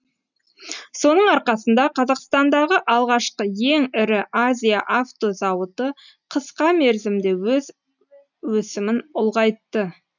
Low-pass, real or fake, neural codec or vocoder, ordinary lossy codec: 7.2 kHz; real; none; none